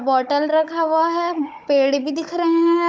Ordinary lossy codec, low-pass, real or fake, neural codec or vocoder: none; none; fake; codec, 16 kHz, 16 kbps, FunCodec, trained on Chinese and English, 50 frames a second